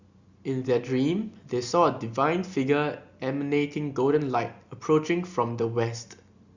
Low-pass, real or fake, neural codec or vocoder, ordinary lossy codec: 7.2 kHz; real; none; Opus, 64 kbps